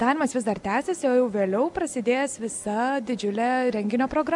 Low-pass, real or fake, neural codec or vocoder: 10.8 kHz; real; none